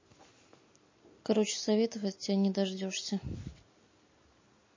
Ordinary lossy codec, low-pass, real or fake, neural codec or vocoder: MP3, 32 kbps; 7.2 kHz; real; none